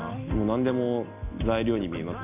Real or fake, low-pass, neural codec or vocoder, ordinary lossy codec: real; 3.6 kHz; none; none